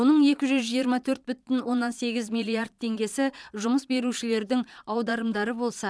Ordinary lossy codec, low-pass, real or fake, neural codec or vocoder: none; none; fake; vocoder, 22.05 kHz, 80 mel bands, Vocos